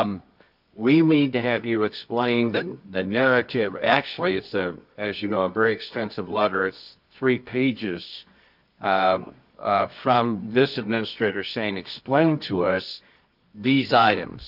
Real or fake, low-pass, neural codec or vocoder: fake; 5.4 kHz; codec, 24 kHz, 0.9 kbps, WavTokenizer, medium music audio release